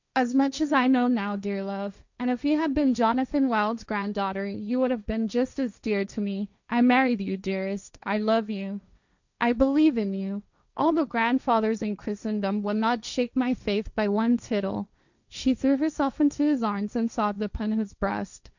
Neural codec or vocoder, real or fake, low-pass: codec, 16 kHz, 1.1 kbps, Voila-Tokenizer; fake; 7.2 kHz